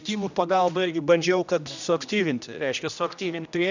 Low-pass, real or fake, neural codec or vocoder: 7.2 kHz; fake; codec, 16 kHz, 1 kbps, X-Codec, HuBERT features, trained on general audio